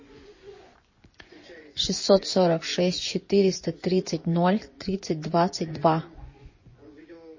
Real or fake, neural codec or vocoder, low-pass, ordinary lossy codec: fake; vocoder, 24 kHz, 100 mel bands, Vocos; 7.2 kHz; MP3, 32 kbps